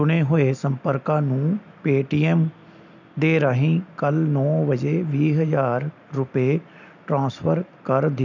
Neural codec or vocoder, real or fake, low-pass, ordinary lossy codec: none; real; 7.2 kHz; none